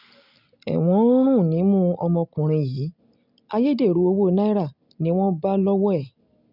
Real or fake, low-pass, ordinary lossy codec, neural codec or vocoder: real; 5.4 kHz; none; none